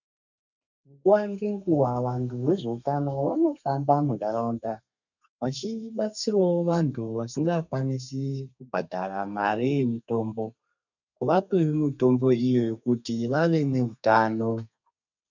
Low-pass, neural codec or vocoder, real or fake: 7.2 kHz; codec, 32 kHz, 1.9 kbps, SNAC; fake